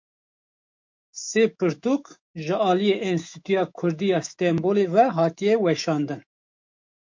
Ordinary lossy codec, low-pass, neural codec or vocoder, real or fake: MP3, 48 kbps; 7.2 kHz; autoencoder, 48 kHz, 128 numbers a frame, DAC-VAE, trained on Japanese speech; fake